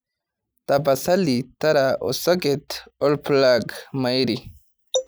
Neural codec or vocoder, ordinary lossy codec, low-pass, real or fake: none; none; none; real